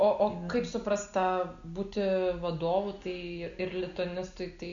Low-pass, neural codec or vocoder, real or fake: 7.2 kHz; none; real